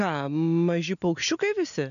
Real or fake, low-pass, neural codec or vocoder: real; 7.2 kHz; none